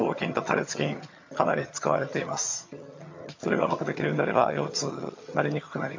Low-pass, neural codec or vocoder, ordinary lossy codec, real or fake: 7.2 kHz; vocoder, 22.05 kHz, 80 mel bands, HiFi-GAN; MP3, 48 kbps; fake